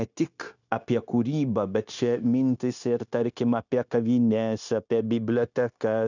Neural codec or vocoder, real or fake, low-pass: codec, 16 kHz, 0.9 kbps, LongCat-Audio-Codec; fake; 7.2 kHz